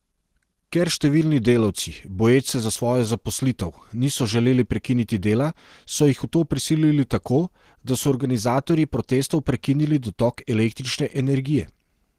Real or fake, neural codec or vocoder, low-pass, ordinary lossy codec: real; none; 14.4 kHz; Opus, 16 kbps